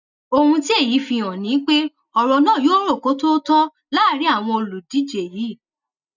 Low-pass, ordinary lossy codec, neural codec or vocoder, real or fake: 7.2 kHz; none; none; real